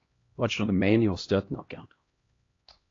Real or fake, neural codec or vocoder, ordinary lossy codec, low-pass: fake; codec, 16 kHz, 1 kbps, X-Codec, HuBERT features, trained on LibriSpeech; AAC, 32 kbps; 7.2 kHz